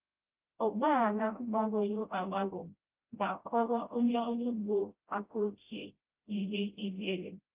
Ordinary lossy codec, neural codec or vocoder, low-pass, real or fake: Opus, 32 kbps; codec, 16 kHz, 0.5 kbps, FreqCodec, smaller model; 3.6 kHz; fake